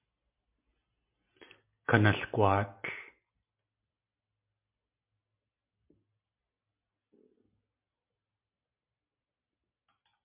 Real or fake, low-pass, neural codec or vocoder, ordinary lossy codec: real; 3.6 kHz; none; MP3, 32 kbps